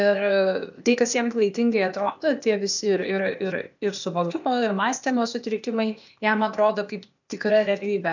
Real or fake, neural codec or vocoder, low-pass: fake; codec, 16 kHz, 0.8 kbps, ZipCodec; 7.2 kHz